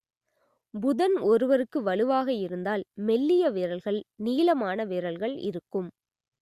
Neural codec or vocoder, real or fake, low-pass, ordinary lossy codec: none; real; 14.4 kHz; Opus, 64 kbps